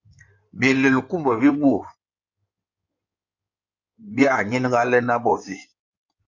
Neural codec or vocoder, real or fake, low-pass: codec, 16 kHz in and 24 kHz out, 2.2 kbps, FireRedTTS-2 codec; fake; 7.2 kHz